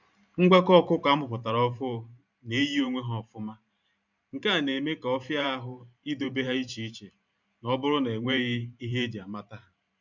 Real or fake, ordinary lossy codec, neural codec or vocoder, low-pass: fake; none; vocoder, 44.1 kHz, 128 mel bands every 512 samples, BigVGAN v2; 7.2 kHz